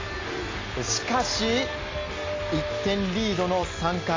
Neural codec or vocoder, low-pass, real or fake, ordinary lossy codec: none; 7.2 kHz; real; AAC, 32 kbps